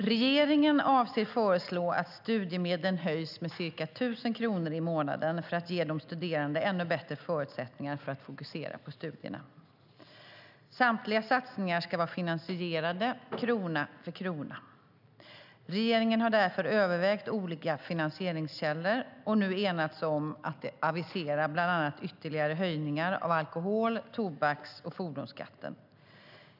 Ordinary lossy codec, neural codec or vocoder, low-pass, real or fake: none; none; 5.4 kHz; real